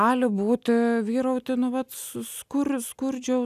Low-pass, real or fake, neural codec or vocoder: 14.4 kHz; real; none